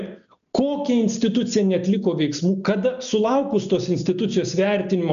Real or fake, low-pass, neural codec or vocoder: real; 7.2 kHz; none